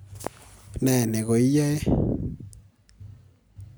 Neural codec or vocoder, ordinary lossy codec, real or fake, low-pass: none; none; real; none